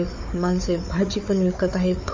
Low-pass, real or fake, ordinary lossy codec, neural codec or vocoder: 7.2 kHz; fake; MP3, 32 kbps; codec, 16 kHz, 4 kbps, FunCodec, trained on Chinese and English, 50 frames a second